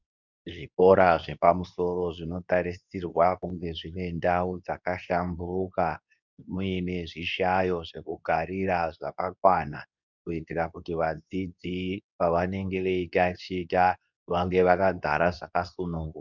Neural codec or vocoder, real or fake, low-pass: codec, 24 kHz, 0.9 kbps, WavTokenizer, medium speech release version 2; fake; 7.2 kHz